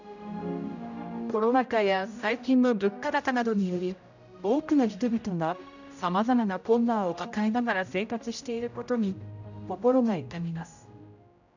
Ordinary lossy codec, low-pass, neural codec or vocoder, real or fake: none; 7.2 kHz; codec, 16 kHz, 0.5 kbps, X-Codec, HuBERT features, trained on general audio; fake